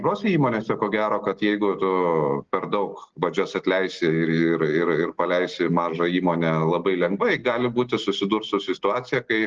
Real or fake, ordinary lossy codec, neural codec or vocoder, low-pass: real; Opus, 16 kbps; none; 7.2 kHz